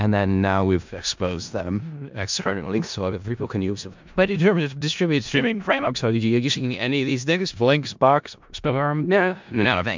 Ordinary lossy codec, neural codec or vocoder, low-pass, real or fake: MP3, 64 kbps; codec, 16 kHz in and 24 kHz out, 0.4 kbps, LongCat-Audio-Codec, four codebook decoder; 7.2 kHz; fake